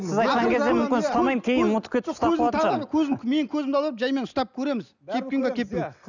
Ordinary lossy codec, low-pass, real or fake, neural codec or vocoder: none; 7.2 kHz; real; none